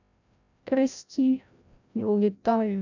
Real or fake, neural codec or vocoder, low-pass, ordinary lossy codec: fake; codec, 16 kHz, 0.5 kbps, FreqCodec, larger model; 7.2 kHz; none